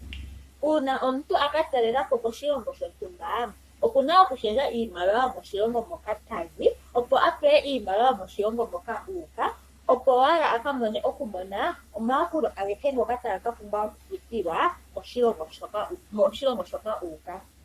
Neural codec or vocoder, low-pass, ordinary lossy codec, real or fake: codec, 44.1 kHz, 3.4 kbps, Pupu-Codec; 14.4 kHz; Opus, 64 kbps; fake